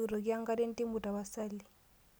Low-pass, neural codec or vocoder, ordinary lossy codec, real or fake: none; none; none; real